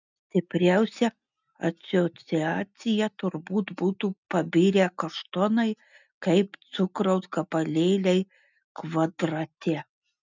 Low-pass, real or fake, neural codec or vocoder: 7.2 kHz; real; none